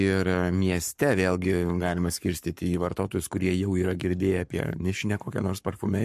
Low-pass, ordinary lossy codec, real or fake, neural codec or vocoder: 14.4 kHz; MP3, 64 kbps; fake; codec, 44.1 kHz, 7.8 kbps, Pupu-Codec